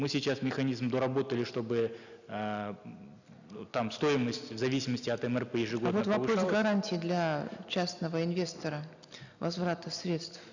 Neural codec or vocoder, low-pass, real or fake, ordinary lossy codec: none; 7.2 kHz; real; none